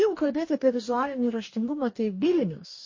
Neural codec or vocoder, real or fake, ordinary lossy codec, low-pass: codec, 44.1 kHz, 2.6 kbps, DAC; fake; MP3, 32 kbps; 7.2 kHz